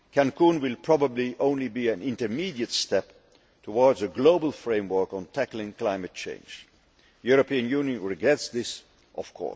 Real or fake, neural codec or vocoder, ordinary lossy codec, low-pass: real; none; none; none